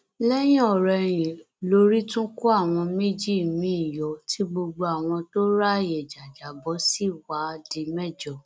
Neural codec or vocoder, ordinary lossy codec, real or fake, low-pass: none; none; real; none